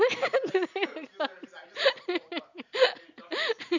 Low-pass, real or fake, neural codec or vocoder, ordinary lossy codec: 7.2 kHz; real; none; none